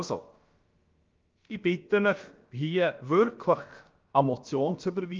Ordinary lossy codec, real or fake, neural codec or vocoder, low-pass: Opus, 24 kbps; fake; codec, 16 kHz, about 1 kbps, DyCAST, with the encoder's durations; 7.2 kHz